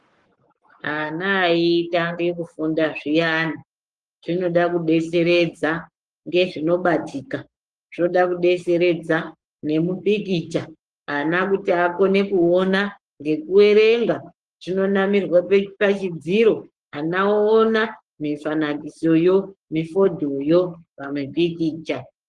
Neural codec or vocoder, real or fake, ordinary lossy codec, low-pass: codec, 44.1 kHz, 7.8 kbps, Pupu-Codec; fake; Opus, 24 kbps; 10.8 kHz